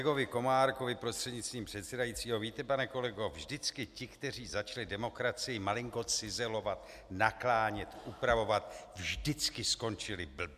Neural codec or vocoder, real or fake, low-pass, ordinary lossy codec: none; real; 14.4 kHz; MP3, 96 kbps